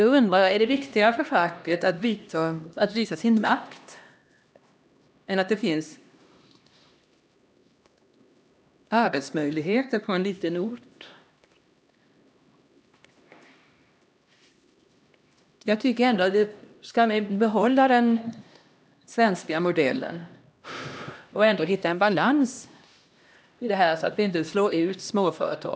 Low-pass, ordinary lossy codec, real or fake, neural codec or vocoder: none; none; fake; codec, 16 kHz, 1 kbps, X-Codec, HuBERT features, trained on LibriSpeech